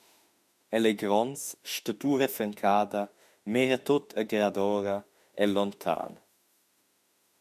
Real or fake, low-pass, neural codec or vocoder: fake; 14.4 kHz; autoencoder, 48 kHz, 32 numbers a frame, DAC-VAE, trained on Japanese speech